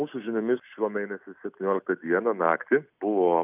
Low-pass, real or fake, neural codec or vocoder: 3.6 kHz; real; none